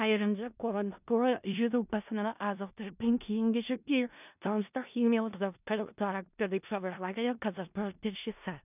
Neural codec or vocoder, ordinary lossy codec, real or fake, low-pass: codec, 16 kHz in and 24 kHz out, 0.4 kbps, LongCat-Audio-Codec, four codebook decoder; none; fake; 3.6 kHz